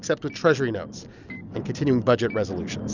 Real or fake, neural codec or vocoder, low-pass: real; none; 7.2 kHz